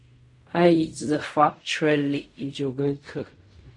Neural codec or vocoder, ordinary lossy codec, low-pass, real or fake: codec, 16 kHz in and 24 kHz out, 0.4 kbps, LongCat-Audio-Codec, fine tuned four codebook decoder; MP3, 48 kbps; 10.8 kHz; fake